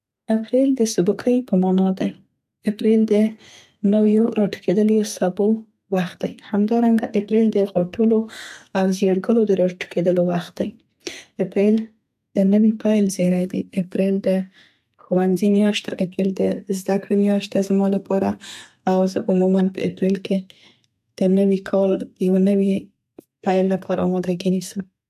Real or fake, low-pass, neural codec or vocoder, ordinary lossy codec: fake; 14.4 kHz; codec, 32 kHz, 1.9 kbps, SNAC; AAC, 96 kbps